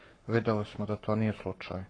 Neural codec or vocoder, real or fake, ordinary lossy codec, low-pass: codec, 44.1 kHz, 7.8 kbps, Pupu-Codec; fake; AAC, 32 kbps; 9.9 kHz